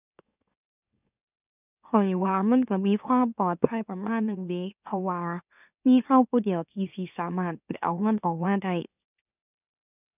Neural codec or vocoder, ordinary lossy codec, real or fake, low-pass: autoencoder, 44.1 kHz, a latent of 192 numbers a frame, MeloTTS; none; fake; 3.6 kHz